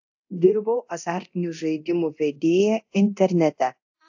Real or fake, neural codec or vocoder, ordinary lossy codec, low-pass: fake; codec, 24 kHz, 0.9 kbps, DualCodec; AAC, 48 kbps; 7.2 kHz